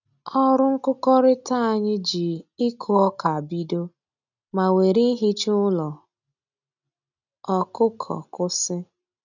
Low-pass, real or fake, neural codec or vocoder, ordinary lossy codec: 7.2 kHz; real; none; none